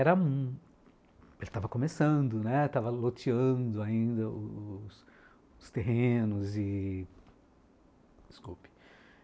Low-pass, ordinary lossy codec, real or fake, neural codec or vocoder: none; none; real; none